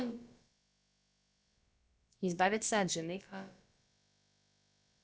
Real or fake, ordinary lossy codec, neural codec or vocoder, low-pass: fake; none; codec, 16 kHz, about 1 kbps, DyCAST, with the encoder's durations; none